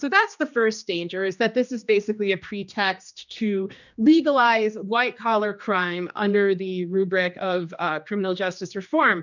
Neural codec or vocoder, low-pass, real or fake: codec, 16 kHz, 2 kbps, X-Codec, HuBERT features, trained on general audio; 7.2 kHz; fake